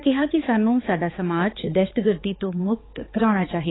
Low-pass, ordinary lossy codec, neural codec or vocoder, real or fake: 7.2 kHz; AAC, 16 kbps; codec, 16 kHz, 4 kbps, X-Codec, HuBERT features, trained on balanced general audio; fake